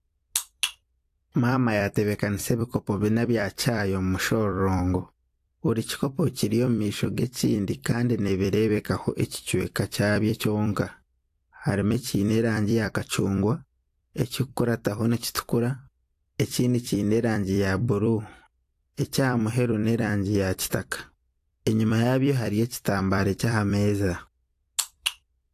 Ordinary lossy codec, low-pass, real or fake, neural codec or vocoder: AAC, 64 kbps; 14.4 kHz; fake; vocoder, 44.1 kHz, 128 mel bands every 256 samples, BigVGAN v2